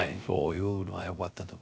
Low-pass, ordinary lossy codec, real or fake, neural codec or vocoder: none; none; fake; codec, 16 kHz, 0.3 kbps, FocalCodec